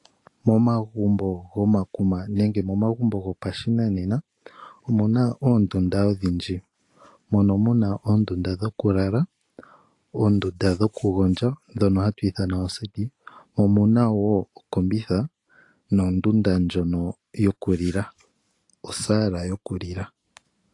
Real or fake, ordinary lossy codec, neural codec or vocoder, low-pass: real; AAC, 48 kbps; none; 10.8 kHz